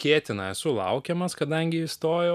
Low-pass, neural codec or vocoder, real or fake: 14.4 kHz; none; real